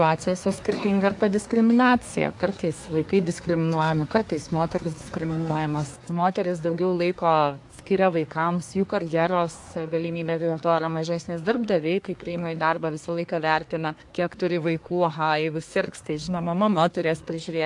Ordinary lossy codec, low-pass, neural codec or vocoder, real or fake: AAC, 64 kbps; 10.8 kHz; codec, 24 kHz, 1 kbps, SNAC; fake